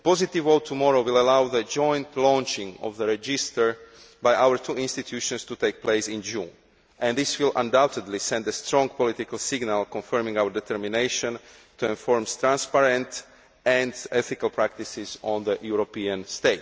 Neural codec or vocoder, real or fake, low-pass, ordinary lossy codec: none; real; none; none